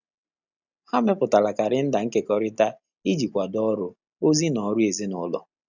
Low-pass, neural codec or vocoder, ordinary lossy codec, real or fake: 7.2 kHz; none; none; real